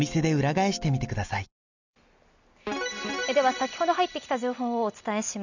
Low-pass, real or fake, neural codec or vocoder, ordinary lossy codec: 7.2 kHz; real; none; none